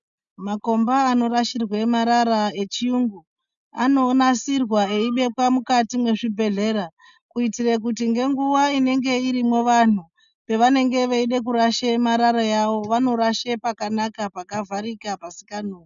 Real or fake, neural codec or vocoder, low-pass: real; none; 7.2 kHz